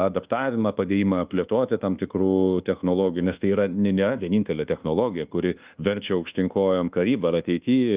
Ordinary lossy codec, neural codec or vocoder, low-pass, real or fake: Opus, 32 kbps; codec, 24 kHz, 1.2 kbps, DualCodec; 3.6 kHz; fake